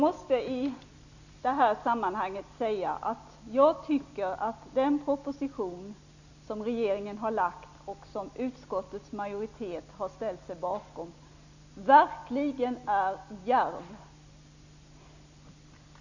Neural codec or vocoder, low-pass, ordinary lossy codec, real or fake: none; 7.2 kHz; none; real